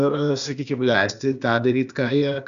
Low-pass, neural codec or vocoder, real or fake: 7.2 kHz; codec, 16 kHz, 0.8 kbps, ZipCodec; fake